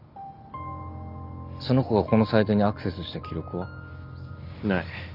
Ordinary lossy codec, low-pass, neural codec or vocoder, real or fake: none; 5.4 kHz; none; real